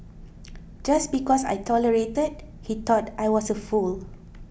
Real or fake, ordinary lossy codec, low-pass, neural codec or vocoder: real; none; none; none